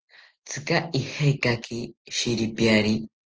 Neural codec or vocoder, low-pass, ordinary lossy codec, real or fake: none; 7.2 kHz; Opus, 16 kbps; real